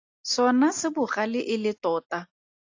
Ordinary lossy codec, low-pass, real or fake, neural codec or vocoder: AAC, 48 kbps; 7.2 kHz; real; none